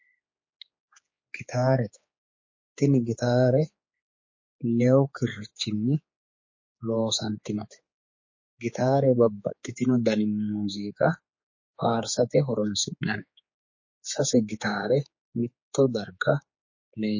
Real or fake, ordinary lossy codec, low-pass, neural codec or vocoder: fake; MP3, 32 kbps; 7.2 kHz; codec, 16 kHz, 4 kbps, X-Codec, HuBERT features, trained on general audio